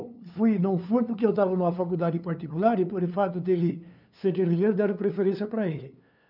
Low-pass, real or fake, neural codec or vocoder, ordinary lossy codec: 5.4 kHz; fake; codec, 16 kHz, 2 kbps, FunCodec, trained on LibriTTS, 25 frames a second; none